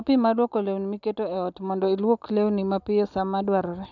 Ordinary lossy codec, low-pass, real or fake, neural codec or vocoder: none; 7.2 kHz; real; none